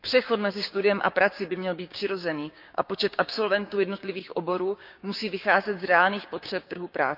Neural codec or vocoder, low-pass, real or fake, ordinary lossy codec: codec, 16 kHz, 6 kbps, DAC; 5.4 kHz; fake; none